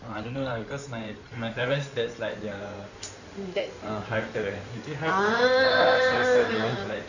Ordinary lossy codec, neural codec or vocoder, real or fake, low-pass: none; codec, 16 kHz in and 24 kHz out, 2.2 kbps, FireRedTTS-2 codec; fake; 7.2 kHz